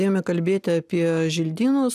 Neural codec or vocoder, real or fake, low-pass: none; real; 14.4 kHz